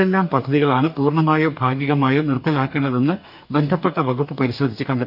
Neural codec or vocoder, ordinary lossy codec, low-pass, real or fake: codec, 44.1 kHz, 2.6 kbps, DAC; none; 5.4 kHz; fake